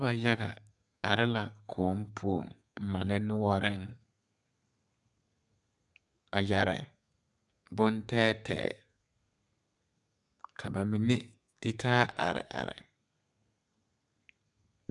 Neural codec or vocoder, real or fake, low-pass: codec, 32 kHz, 1.9 kbps, SNAC; fake; 10.8 kHz